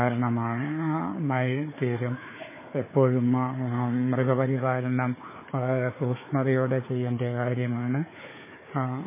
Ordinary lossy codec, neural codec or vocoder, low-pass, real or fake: MP3, 16 kbps; codec, 16 kHz, 4 kbps, FunCodec, trained on Chinese and English, 50 frames a second; 3.6 kHz; fake